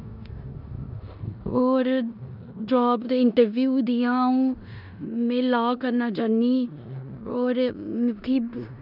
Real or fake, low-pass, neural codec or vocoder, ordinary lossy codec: fake; 5.4 kHz; codec, 16 kHz in and 24 kHz out, 0.9 kbps, LongCat-Audio-Codec, four codebook decoder; none